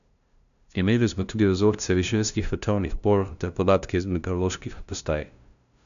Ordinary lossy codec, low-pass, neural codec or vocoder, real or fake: none; 7.2 kHz; codec, 16 kHz, 0.5 kbps, FunCodec, trained on LibriTTS, 25 frames a second; fake